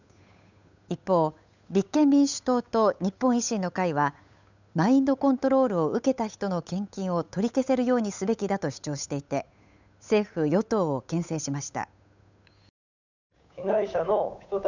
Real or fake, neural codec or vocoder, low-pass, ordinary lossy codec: fake; codec, 16 kHz, 8 kbps, FunCodec, trained on Chinese and English, 25 frames a second; 7.2 kHz; none